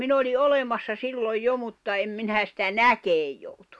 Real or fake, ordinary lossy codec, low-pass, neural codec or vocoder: real; none; none; none